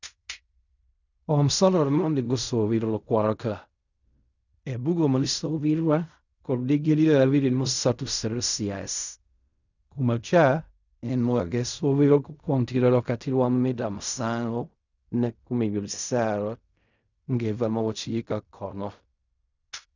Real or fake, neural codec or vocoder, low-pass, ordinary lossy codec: fake; codec, 16 kHz in and 24 kHz out, 0.4 kbps, LongCat-Audio-Codec, fine tuned four codebook decoder; 7.2 kHz; none